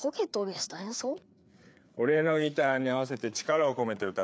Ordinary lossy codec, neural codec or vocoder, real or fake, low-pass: none; codec, 16 kHz, 4 kbps, FreqCodec, larger model; fake; none